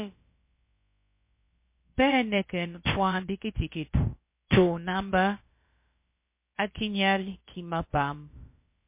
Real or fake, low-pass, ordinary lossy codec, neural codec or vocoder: fake; 3.6 kHz; MP3, 24 kbps; codec, 16 kHz, about 1 kbps, DyCAST, with the encoder's durations